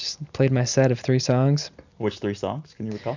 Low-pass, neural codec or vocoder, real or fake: 7.2 kHz; none; real